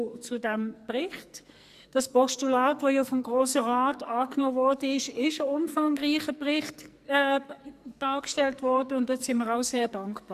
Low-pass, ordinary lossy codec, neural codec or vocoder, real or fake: 14.4 kHz; Opus, 64 kbps; codec, 44.1 kHz, 2.6 kbps, SNAC; fake